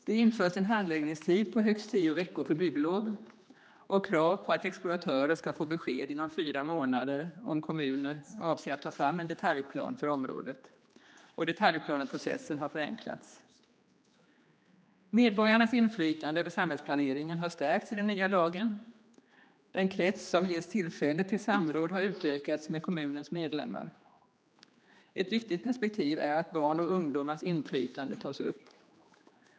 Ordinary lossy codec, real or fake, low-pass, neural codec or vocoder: none; fake; none; codec, 16 kHz, 2 kbps, X-Codec, HuBERT features, trained on general audio